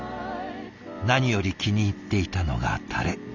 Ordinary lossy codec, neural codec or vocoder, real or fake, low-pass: Opus, 64 kbps; none; real; 7.2 kHz